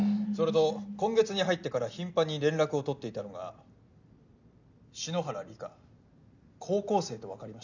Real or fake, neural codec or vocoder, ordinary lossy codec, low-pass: real; none; none; 7.2 kHz